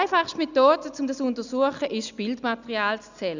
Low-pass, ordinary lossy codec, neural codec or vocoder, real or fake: 7.2 kHz; none; none; real